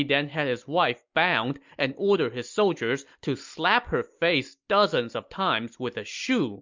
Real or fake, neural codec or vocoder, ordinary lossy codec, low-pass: real; none; MP3, 64 kbps; 7.2 kHz